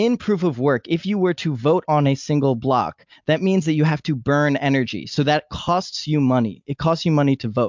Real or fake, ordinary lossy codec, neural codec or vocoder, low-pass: real; MP3, 64 kbps; none; 7.2 kHz